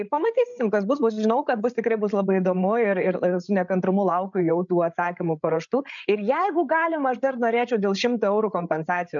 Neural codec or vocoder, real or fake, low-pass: codec, 16 kHz, 8 kbps, FreqCodec, larger model; fake; 7.2 kHz